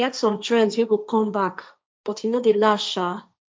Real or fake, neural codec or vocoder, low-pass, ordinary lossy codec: fake; codec, 16 kHz, 1.1 kbps, Voila-Tokenizer; none; none